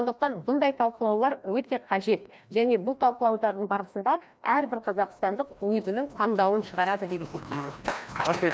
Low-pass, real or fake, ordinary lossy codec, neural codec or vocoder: none; fake; none; codec, 16 kHz, 1 kbps, FreqCodec, larger model